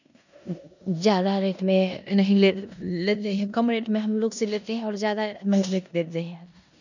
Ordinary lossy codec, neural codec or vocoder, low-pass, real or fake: none; codec, 16 kHz in and 24 kHz out, 0.9 kbps, LongCat-Audio-Codec, four codebook decoder; 7.2 kHz; fake